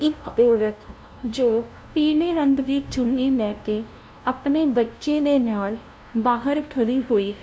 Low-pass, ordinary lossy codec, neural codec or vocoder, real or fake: none; none; codec, 16 kHz, 0.5 kbps, FunCodec, trained on LibriTTS, 25 frames a second; fake